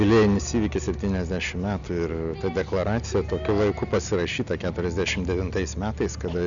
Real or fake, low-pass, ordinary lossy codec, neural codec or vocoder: real; 7.2 kHz; MP3, 96 kbps; none